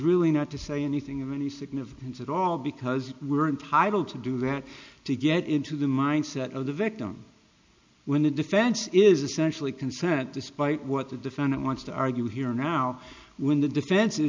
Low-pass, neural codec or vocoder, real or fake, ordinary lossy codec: 7.2 kHz; none; real; MP3, 64 kbps